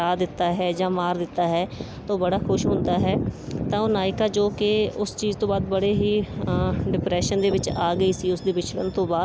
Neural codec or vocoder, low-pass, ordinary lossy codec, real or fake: none; none; none; real